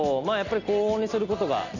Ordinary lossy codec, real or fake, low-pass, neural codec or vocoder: none; real; 7.2 kHz; none